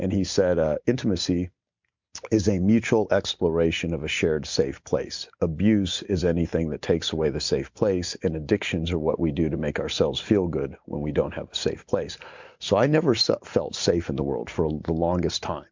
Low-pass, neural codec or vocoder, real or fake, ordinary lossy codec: 7.2 kHz; none; real; MP3, 64 kbps